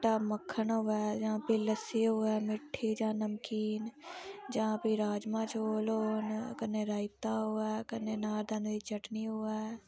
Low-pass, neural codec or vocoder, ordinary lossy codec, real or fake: none; none; none; real